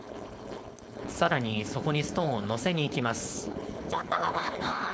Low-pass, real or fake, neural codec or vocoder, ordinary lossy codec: none; fake; codec, 16 kHz, 4.8 kbps, FACodec; none